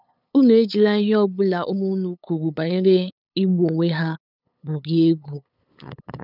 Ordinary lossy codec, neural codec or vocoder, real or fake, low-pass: none; codec, 16 kHz, 8 kbps, FunCodec, trained on LibriTTS, 25 frames a second; fake; 5.4 kHz